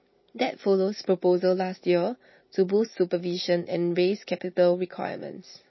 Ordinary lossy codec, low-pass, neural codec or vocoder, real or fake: MP3, 24 kbps; 7.2 kHz; none; real